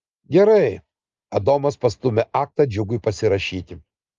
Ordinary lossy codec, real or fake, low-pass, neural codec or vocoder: Opus, 32 kbps; real; 7.2 kHz; none